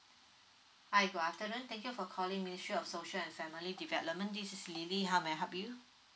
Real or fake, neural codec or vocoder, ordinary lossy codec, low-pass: real; none; none; none